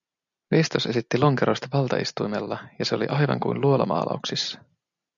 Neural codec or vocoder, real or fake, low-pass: none; real; 7.2 kHz